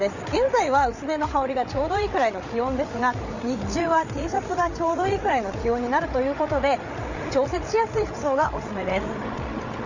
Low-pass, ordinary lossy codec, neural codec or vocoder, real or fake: 7.2 kHz; none; codec, 16 kHz, 8 kbps, FreqCodec, larger model; fake